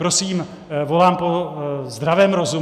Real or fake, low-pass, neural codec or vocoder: real; 14.4 kHz; none